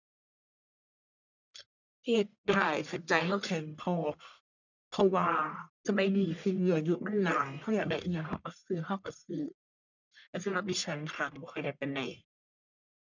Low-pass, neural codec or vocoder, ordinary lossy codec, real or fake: 7.2 kHz; codec, 44.1 kHz, 1.7 kbps, Pupu-Codec; none; fake